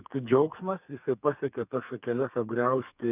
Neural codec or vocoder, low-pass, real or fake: codec, 32 kHz, 1.9 kbps, SNAC; 3.6 kHz; fake